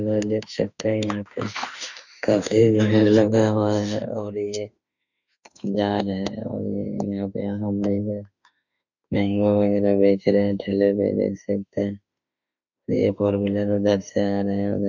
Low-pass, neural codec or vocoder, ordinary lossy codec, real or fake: 7.2 kHz; autoencoder, 48 kHz, 32 numbers a frame, DAC-VAE, trained on Japanese speech; none; fake